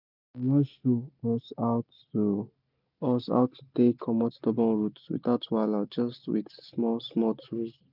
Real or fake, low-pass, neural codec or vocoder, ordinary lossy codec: real; 5.4 kHz; none; none